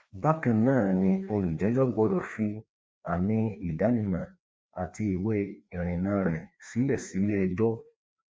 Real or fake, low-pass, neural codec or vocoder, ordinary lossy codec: fake; none; codec, 16 kHz, 2 kbps, FreqCodec, larger model; none